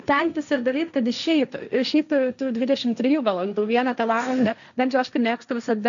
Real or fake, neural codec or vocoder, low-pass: fake; codec, 16 kHz, 1.1 kbps, Voila-Tokenizer; 7.2 kHz